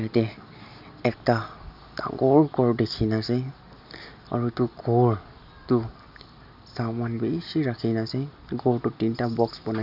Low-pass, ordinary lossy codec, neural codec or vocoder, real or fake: 5.4 kHz; none; none; real